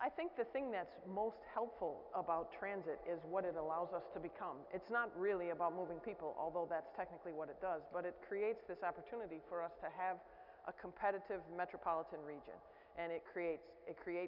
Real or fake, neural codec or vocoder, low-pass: real; none; 5.4 kHz